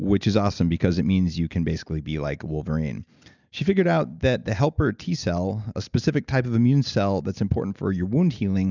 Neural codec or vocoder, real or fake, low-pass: none; real; 7.2 kHz